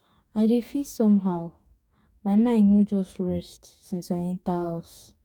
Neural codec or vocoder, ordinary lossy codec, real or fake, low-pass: codec, 44.1 kHz, 2.6 kbps, DAC; none; fake; 19.8 kHz